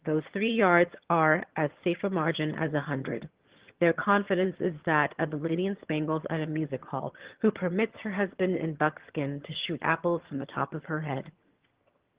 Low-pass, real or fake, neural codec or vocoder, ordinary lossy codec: 3.6 kHz; fake; vocoder, 22.05 kHz, 80 mel bands, HiFi-GAN; Opus, 16 kbps